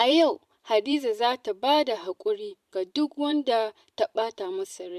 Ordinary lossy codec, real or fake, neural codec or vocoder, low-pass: MP3, 96 kbps; fake; vocoder, 44.1 kHz, 128 mel bands every 512 samples, BigVGAN v2; 14.4 kHz